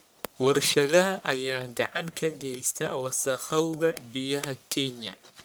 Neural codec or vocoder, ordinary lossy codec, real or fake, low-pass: codec, 44.1 kHz, 1.7 kbps, Pupu-Codec; none; fake; none